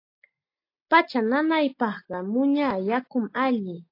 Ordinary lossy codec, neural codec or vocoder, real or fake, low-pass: AAC, 32 kbps; none; real; 5.4 kHz